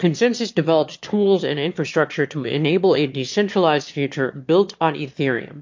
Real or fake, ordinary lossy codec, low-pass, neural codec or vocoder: fake; MP3, 48 kbps; 7.2 kHz; autoencoder, 22.05 kHz, a latent of 192 numbers a frame, VITS, trained on one speaker